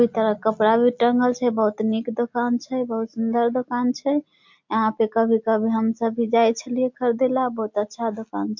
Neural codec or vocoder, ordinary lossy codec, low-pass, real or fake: none; MP3, 48 kbps; 7.2 kHz; real